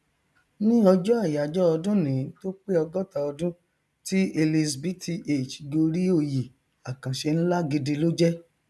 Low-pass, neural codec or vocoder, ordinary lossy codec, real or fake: none; none; none; real